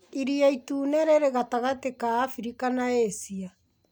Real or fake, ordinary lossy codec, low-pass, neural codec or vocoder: real; none; none; none